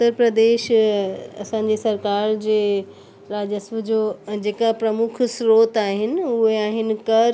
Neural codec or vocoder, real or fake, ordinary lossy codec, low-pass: none; real; none; none